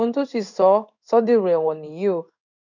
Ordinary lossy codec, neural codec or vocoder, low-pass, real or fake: none; codec, 16 kHz in and 24 kHz out, 1 kbps, XY-Tokenizer; 7.2 kHz; fake